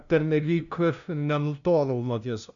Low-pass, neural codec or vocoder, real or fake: 7.2 kHz; codec, 16 kHz, 0.5 kbps, FunCodec, trained on LibriTTS, 25 frames a second; fake